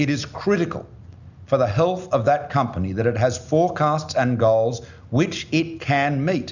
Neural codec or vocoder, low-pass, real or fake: none; 7.2 kHz; real